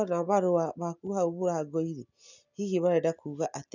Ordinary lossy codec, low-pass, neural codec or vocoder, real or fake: none; 7.2 kHz; none; real